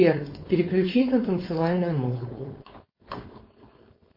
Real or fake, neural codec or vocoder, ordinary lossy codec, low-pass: fake; codec, 16 kHz, 4.8 kbps, FACodec; MP3, 24 kbps; 5.4 kHz